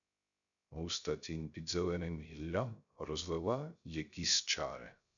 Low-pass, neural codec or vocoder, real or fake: 7.2 kHz; codec, 16 kHz, 0.3 kbps, FocalCodec; fake